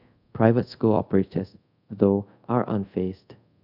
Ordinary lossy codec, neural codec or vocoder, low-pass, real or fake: none; codec, 24 kHz, 0.5 kbps, DualCodec; 5.4 kHz; fake